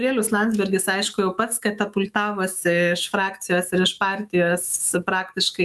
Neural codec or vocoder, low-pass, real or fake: autoencoder, 48 kHz, 128 numbers a frame, DAC-VAE, trained on Japanese speech; 14.4 kHz; fake